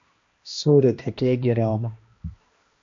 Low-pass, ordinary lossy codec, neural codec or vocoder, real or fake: 7.2 kHz; MP3, 48 kbps; codec, 16 kHz, 1 kbps, X-Codec, HuBERT features, trained on balanced general audio; fake